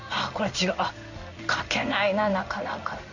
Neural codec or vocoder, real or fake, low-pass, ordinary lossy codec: codec, 16 kHz in and 24 kHz out, 1 kbps, XY-Tokenizer; fake; 7.2 kHz; none